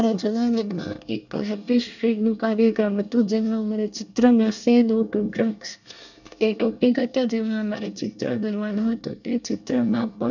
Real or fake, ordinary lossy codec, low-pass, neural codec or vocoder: fake; none; 7.2 kHz; codec, 24 kHz, 1 kbps, SNAC